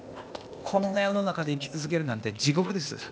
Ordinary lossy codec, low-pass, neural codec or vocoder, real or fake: none; none; codec, 16 kHz, 0.8 kbps, ZipCodec; fake